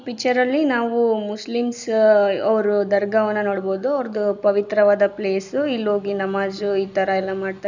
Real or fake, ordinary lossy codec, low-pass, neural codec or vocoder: real; none; 7.2 kHz; none